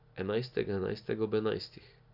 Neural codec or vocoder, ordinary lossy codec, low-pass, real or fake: none; none; 5.4 kHz; real